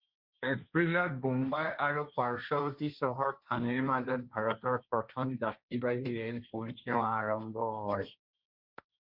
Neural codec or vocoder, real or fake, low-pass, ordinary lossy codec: codec, 16 kHz, 1.1 kbps, Voila-Tokenizer; fake; 5.4 kHz; Opus, 64 kbps